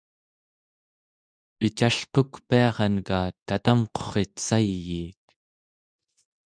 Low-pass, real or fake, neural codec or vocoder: 9.9 kHz; fake; codec, 24 kHz, 0.9 kbps, WavTokenizer, medium speech release version 2